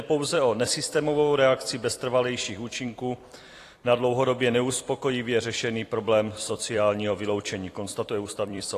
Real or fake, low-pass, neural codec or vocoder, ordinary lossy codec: fake; 14.4 kHz; vocoder, 44.1 kHz, 128 mel bands every 256 samples, BigVGAN v2; AAC, 48 kbps